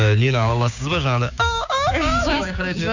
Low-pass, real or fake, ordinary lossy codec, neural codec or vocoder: 7.2 kHz; fake; none; codec, 16 kHz, 6 kbps, DAC